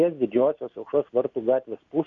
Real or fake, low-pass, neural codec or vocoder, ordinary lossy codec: real; 7.2 kHz; none; MP3, 48 kbps